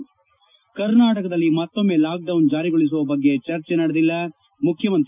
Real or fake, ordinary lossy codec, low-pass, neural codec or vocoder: real; none; 3.6 kHz; none